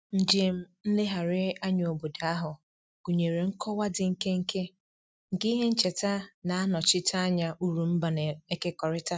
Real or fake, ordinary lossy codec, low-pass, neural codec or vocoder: real; none; none; none